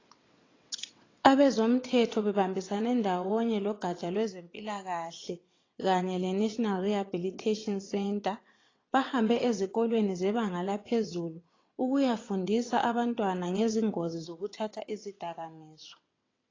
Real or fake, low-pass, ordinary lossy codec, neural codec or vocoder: fake; 7.2 kHz; AAC, 32 kbps; vocoder, 22.05 kHz, 80 mel bands, WaveNeXt